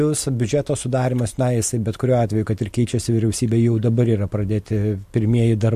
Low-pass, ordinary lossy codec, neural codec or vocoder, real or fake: 14.4 kHz; MP3, 64 kbps; none; real